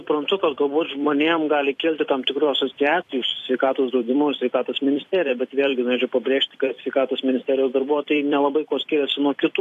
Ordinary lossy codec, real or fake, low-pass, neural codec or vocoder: AAC, 64 kbps; fake; 14.4 kHz; vocoder, 44.1 kHz, 128 mel bands every 256 samples, BigVGAN v2